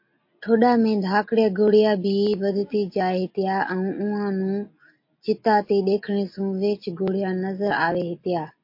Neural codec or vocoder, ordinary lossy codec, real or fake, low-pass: none; MP3, 32 kbps; real; 5.4 kHz